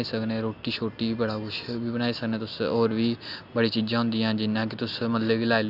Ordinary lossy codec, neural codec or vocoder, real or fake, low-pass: none; none; real; 5.4 kHz